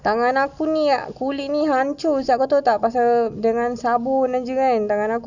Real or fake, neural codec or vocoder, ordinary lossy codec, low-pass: real; none; none; 7.2 kHz